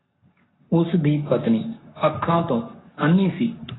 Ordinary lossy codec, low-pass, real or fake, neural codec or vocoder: AAC, 16 kbps; 7.2 kHz; fake; codec, 44.1 kHz, 7.8 kbps, Pupu-Codec